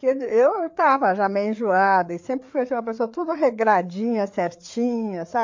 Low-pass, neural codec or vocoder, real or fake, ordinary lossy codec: 7.2 kHz; codec, 16 kHz, 4 kbps, FreqCodec, larger model; fake; MP3, 48 kbps